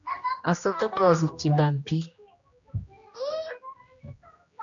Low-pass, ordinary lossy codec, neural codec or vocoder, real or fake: 7.2 kHz; MP3, 64 kbps; codec, 16 kHz, 1 kbps, X-Codec, HuBERT features, trained on general audio; fake